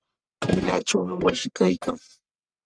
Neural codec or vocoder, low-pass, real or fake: codec, 44.1 kHz, 1.7 kbps, Pupu-Codec; 9.9 kHz; fake